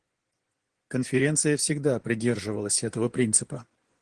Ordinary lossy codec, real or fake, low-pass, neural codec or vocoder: Opus, 16 kbps; fake; 10.8 kHz; vocoder, 24 kHz, 100 mel bands, Vocos